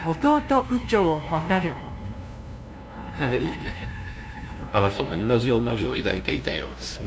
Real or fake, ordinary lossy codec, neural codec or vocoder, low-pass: fake; none; codec, 16 kHz, 0.5 kbps, FunCodec, trained on LibriTTS, 25 frames a second; none